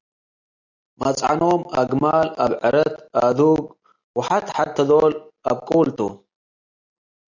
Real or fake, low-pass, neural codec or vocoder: real; 7.2 kHz; none